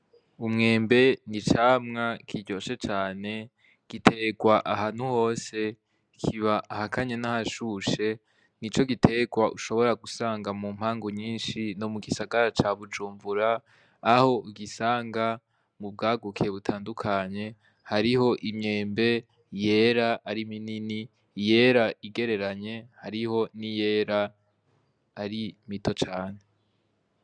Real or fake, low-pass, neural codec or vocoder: real; 9.9 kHz; none